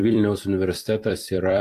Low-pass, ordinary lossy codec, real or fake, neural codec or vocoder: 14.4 kHz; AAC, 64 kbps; fake; vocoder, 44.1 kHz, 128 mel bands every 256 samples, BigVGAN v2